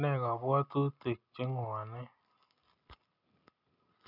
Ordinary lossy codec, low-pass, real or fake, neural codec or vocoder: none; 5.4 kHz; real; none